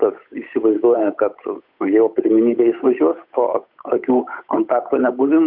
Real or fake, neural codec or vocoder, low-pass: fake; codec, 16 kHz, 8 kbps, FunCodec, trained on Chinese and English, 25 frames a second; 5.4 kHz